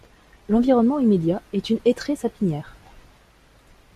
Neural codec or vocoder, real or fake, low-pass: none; real; 14.4 kHz